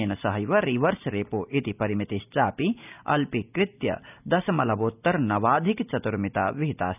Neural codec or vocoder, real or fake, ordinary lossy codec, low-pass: none; real; none; 3.6 kHz